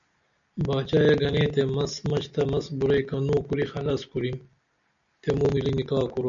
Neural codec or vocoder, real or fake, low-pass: none; real; 7.2 kHz